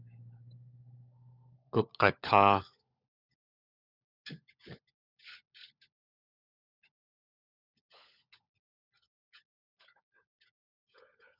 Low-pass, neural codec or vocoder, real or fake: 5.4 kHz; codec, 16 kHz, 16 kbps, FunCodec, trained on LibriTTS, 50 frames a second; fake